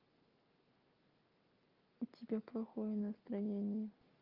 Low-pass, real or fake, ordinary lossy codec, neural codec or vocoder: 5.4 kHz; real; Opus, 24 kbps; none